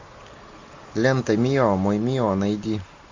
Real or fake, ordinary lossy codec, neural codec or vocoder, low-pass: real; MP3, 64 kbps; none; 7.2 kHz